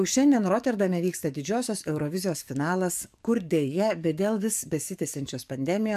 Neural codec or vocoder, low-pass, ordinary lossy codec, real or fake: codec, 44.1 kHz, 7.8 kbps, DAC; 14.4 kHz; MP3, 96 kbps; fake